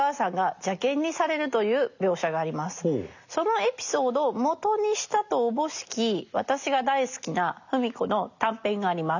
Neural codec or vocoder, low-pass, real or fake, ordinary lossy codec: none; 7.2 kHz; real; none